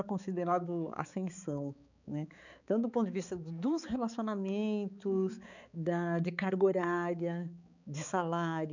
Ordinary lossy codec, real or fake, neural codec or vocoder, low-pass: none; fake; codec, 16 kHz, 4 kbps, X-Codec, HuBERT features, trained on balanced general audio; 7.2 kHz